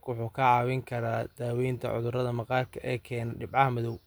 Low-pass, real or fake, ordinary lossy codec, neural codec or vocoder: none; real; none; none